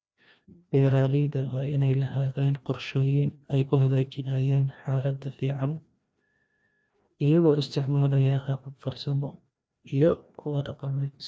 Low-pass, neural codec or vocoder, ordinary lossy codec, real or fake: none; codec, 16 kHz, 1 kbps, FreqCodec, larger model; none; fake